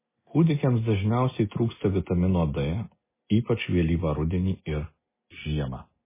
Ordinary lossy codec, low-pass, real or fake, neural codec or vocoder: MP3, 16 kbps; 3.6 kHz; real; none